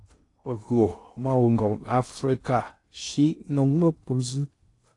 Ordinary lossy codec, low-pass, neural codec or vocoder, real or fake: AAC, 48 kbps; 10.8 kHz; codec, 16 kHz in and 24 kHz out, 0.6 kbps, FocalCodec, streaming, 2048 codes; fake